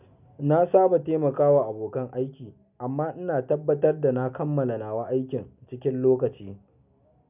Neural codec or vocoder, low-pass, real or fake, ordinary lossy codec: none; 3.6 kHz; real; none